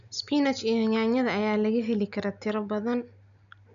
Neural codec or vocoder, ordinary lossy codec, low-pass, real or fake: none; none; 7.2 kHz; real